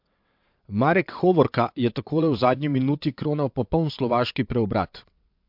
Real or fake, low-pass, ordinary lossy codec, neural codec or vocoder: fake; 5.4 kHz; MP3, 48 kbps; vocoder, 22.05 kHz, 80 mel bands, WaveNeXt